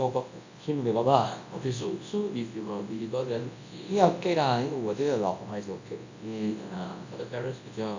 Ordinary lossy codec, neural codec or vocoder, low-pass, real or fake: AAC, 48 kbps; codec, 24 kHz, 0.9 kbps, WavTokenizer, large speech release; 7.2 kHz; fake